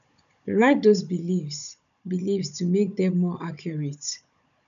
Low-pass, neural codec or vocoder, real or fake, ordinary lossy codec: 7.2 kHz; codec, 16 kHz, 16 kbps, FunCodec, trained on Chinese and English, 50 frames a second; fake; none